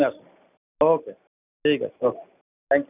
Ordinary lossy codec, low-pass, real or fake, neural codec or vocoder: none; 3.6 kHz; real; none